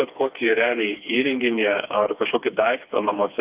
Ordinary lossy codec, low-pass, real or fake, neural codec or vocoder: Opus, 32 kbps; 3.6 kHz; fake; codec, 16 kHz, 2 kbps, FreqCodec, smaller model